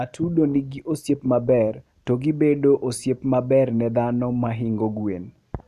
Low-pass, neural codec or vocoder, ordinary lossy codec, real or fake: 14.4 kHz; none; none; real